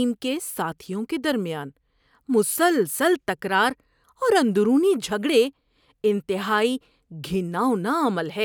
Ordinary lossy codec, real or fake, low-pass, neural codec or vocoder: none; real; none; none